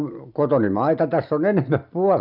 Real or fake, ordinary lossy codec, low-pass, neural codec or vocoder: real; none; 5.4 kHz; none